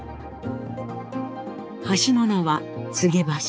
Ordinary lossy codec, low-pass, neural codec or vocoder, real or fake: none; none; codec, 16 kHz, 4 kbps, X-Codec, HuBERT features, trained on balanced general audio; fake